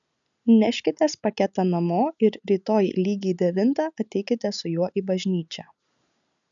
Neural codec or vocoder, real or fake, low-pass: none; real; 7.2 kHz